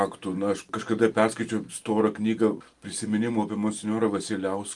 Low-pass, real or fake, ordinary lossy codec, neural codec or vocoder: 10.8 kHz; real; Opus, 32 kbps; none